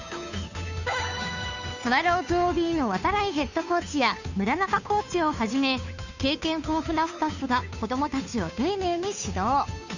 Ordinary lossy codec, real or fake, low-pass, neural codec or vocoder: none; fake; 7.2 kHz; codec, 16 kHz, 2 kbps, FunCodec, trained on Chinese and English, 25 frames a second